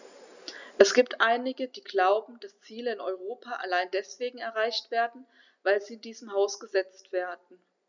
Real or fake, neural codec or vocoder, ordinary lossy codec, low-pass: real; none; none; 7.2 kHz